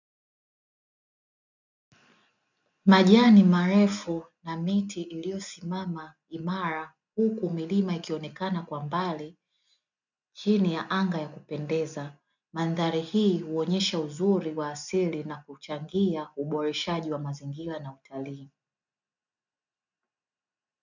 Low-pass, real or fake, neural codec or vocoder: 7.2 kHz; real; none